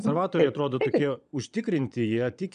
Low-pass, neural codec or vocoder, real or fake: 9.9 kHz; vocoder, 22.05 kHz, 80 mel bands, Vocos; fake